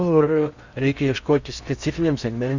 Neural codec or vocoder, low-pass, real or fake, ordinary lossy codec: codec, 16 kHz in and 24 kHz out, 0.8 kbps, FocalCodec, streaming, 65536 codes; 7.2 kHz; fake; Opus, 64 kbps